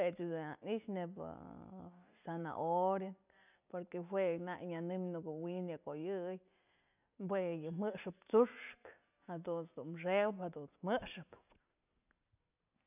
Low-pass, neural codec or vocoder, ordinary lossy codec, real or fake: 3.6 kHz; none; none; real